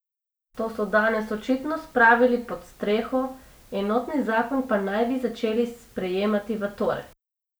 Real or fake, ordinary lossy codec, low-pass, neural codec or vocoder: real; none; none; none